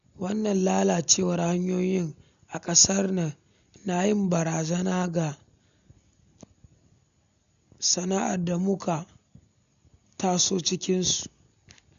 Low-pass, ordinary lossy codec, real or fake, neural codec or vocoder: 7.2 kHz; none; real; none